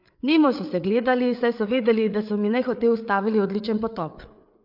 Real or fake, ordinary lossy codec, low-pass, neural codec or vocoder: fake; MP3, 48 kbps; 5.4 kHz; codec, 16 kHz, 8 kbps, FreqCodec, larger model